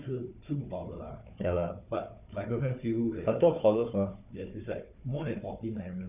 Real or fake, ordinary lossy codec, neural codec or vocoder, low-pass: fake; none; codec, 16 kHz, 4 kbps, FunCodec, trained on Chinese and English, 50 frames a second; 3.6 kHz